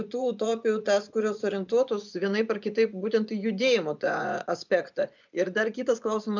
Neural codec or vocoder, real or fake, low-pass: vocoder, 24 kHz, 100 mel bands, Vocos; fake; 7.2 kHz